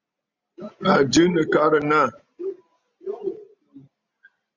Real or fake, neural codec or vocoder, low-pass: real; none; 7.2 kHz